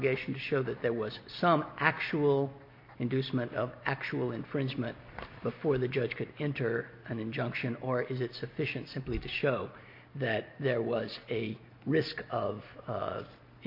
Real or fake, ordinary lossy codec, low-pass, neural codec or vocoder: real; MP3, 32 kbps; 5.4 kHz; none